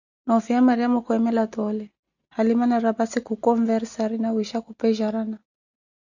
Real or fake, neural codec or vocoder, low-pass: real; none; 7.2 kHz